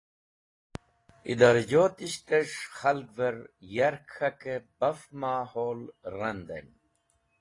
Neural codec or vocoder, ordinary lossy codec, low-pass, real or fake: none; AAC, 32 kbps; 10.8 kHz; real